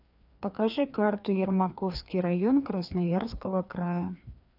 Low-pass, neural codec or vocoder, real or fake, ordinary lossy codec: 5.4 kHz; codec, 16 kHz, 2 kbps, FreqCodec, larger model; fake; none